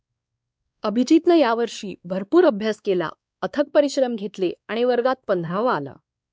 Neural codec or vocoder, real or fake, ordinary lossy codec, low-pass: codec, 16 kHz, 2 kbps, X-Codec, WavLM features, trained on Multilingual LibriSpeech; fake; none; none